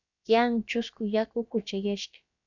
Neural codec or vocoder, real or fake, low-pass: codec, 16 kHz, about 1 kbps, DyCAST, with the encoder's durations; fake; 7.2 kHz